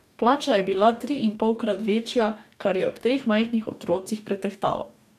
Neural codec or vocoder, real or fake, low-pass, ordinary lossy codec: codec, 44.1 kHz, 2.6 kbps, DAC; fake; 14.4 kHz; none